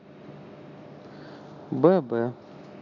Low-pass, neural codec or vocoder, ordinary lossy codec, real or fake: 7.2 kHz; none; none; real